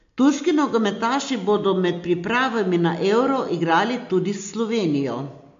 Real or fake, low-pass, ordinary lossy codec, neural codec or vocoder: real; 7.2 kHz; MP3, 48 kbps; none